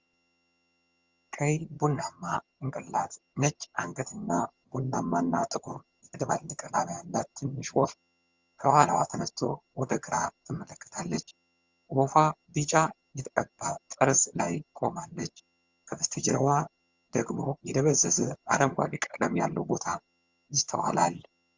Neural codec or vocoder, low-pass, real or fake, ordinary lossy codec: vocoder, 22.05 kHz, 80 mel bands, HiFi-GAN; 7.2 kHz; fake; Opus, 32 kbps